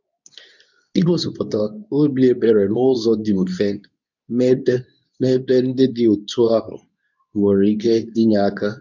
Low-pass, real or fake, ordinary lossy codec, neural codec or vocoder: 7.2 kHz; fake; none; codec, 24 kHz, 0.9 kbps, WavTokenizer, medium speech release version 2